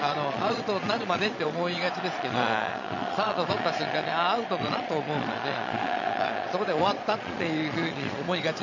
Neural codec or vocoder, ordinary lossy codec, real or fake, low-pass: vocoder, 22.05 kHz, 80 mel bands, Vocos; none; fake; 7.2 kHz